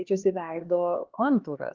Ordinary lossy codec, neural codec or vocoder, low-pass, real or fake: Opus, 16 kbps; codec, 16 kHz, 2 kbps, X-Codec, HuBERT features, trained on LibriSpeech; 7.2 kHz; fake